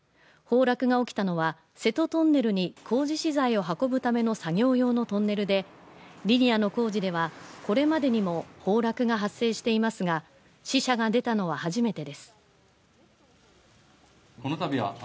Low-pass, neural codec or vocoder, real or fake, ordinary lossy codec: none; none; real; none